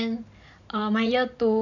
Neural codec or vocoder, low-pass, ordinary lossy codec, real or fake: vocoder, 44.1 kHz, 128 mel bands every 512 samples, BigVGAN v2; 7.2 kHz; none; fake